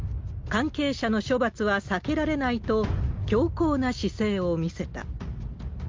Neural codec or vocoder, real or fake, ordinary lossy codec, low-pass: none; real; Opus, 32 kbps; 7.2 kHz